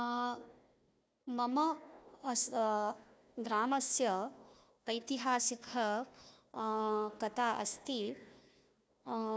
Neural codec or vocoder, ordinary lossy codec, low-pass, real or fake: codec, 16 kHz, 1 kbps, FunCodec, trained on Chinese and English, 50 frames a second; none; none; fake